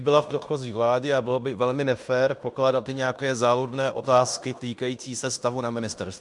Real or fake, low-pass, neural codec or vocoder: fake; 10.8 kHz; codec, 16 kHz in and 24 kHz out, 0.9 kbps, LongCat-Audio-Codec, fine tuned four codebook decoder